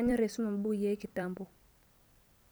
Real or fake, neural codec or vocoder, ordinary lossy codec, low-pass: fake; vocoder, 44.1 kHz, 128 mel bands every 256 samples, BigVGAN v2; none; none